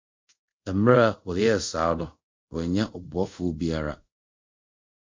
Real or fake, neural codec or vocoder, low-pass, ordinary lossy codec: fake; codec, 24 kHz, 0.5 kbps, DualCodec; 7.2 kHz; MP3, 64 kbps